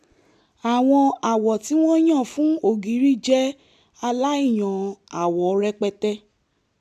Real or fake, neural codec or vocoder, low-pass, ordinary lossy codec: real; none; 14.4 kHz; none